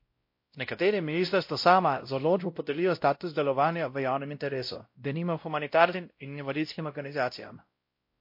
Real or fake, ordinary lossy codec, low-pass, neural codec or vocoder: fake; MP3, 32 kbps; 5.4 kHz; codec, 16 kHz, 0.5 kbps, X-Codec, WavLM features, trained on Multilingual LibriSpeech